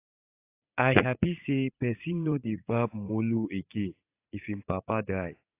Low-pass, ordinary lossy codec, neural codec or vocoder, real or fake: 3.6 kHz; AAC, 24 kbps; vocoder, 44.1 kHz, 80 mel bands, Vocos; fake